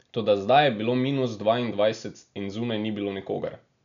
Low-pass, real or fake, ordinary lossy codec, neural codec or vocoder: 7.2 kHz; real; none; none